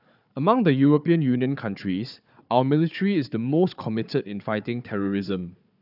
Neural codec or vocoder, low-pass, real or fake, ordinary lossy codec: codec, 16 kHz, 4 kbps, FunCodec, trained on Chinese and English, 50 frames a second; 5.4 kHz; fake; none